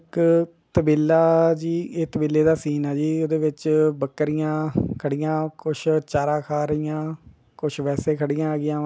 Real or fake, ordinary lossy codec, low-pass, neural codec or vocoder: real; none; none; none